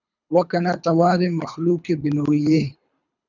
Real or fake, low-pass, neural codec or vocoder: fake; 7.2 kHz; codec, 24 kHz, 3 kbps, HILCodec